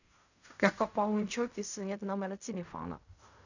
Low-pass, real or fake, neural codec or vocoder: 7.2 kHz; fake; codec, 16 kHz in and 24 kHz out, 0.4 kbps, LongCat-Audio-Codec, fine tuned four codebook decoder